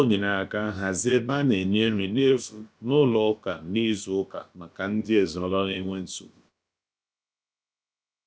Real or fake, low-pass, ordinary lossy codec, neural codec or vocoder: fake; none; none; codec, 16 kHz, about 1 kbps, DyCAST, with the encoder's durations